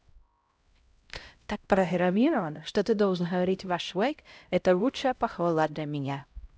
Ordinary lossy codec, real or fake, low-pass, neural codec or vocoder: none; fake; none; codec, 16 kHz, 0.5 kbps, X-Codec, HuBERT features, trained on LibriSpeech